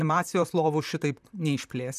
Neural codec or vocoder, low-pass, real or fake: vocoder, 44.1 kHz, 128 mel bands, Pupu-Vocoder; 14.4 kHz; fake